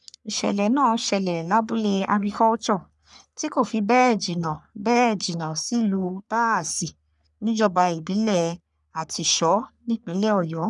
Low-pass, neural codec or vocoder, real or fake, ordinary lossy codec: 10.8 kHz; codec, 44.1 kHz, 3.4 kbps, Pupu-Codec; fake; none